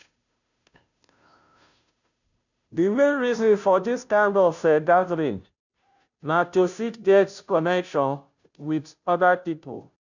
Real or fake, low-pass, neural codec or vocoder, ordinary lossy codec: fake; 7.2 kHz; codec, 16 kHz, 0.5 kbps, FunCodec, trained on Chinese and English, 25 frames a second; none